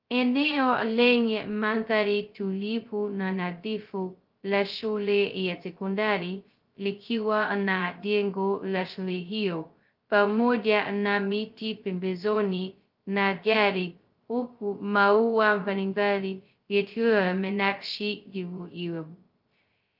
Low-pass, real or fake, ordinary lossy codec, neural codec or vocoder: 5.4 kHz; fake; Opus, 32 kbps; codec, 16 kHz, 0.2 kbps, FocalCodec